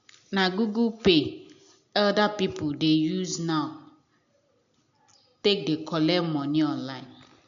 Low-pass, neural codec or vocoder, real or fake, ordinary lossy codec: 7.2 kHz; none; real; none